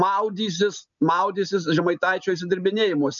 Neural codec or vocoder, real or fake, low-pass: none; real; 7.2 kHz